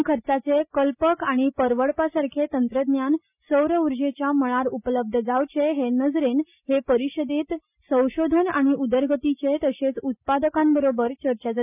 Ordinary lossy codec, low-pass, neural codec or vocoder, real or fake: none; 3.6 kHz; none; real